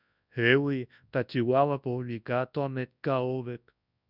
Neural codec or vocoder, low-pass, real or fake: codec, 24 kHz, 0.9 kbps, WavTokenizer, large speech release; 5.4 kHz; fake